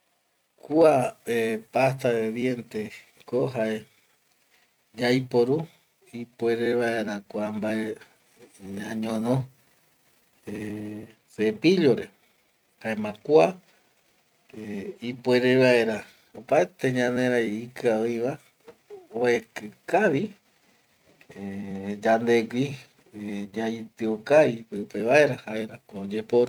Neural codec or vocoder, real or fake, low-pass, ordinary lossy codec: vocoder, 44.1 kHz, 128 mel bands every 256 samples, BigVGAN v2; fake; 19.8 kHz; none